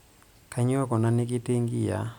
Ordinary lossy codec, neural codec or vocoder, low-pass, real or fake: none; none; 19.8 kHz; real